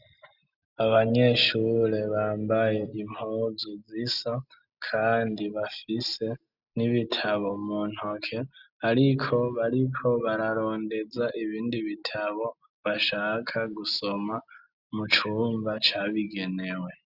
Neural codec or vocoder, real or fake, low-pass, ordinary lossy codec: none; real; 5.4 kHz; Opus, 64 kbps